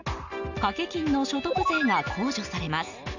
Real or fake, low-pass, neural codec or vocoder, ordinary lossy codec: real; 7.2 kHz; none; none